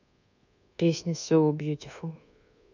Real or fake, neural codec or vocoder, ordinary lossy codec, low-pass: fake; codec, 24 kHz, 1.2 kbps, DualCodec; none; 7.2 kHz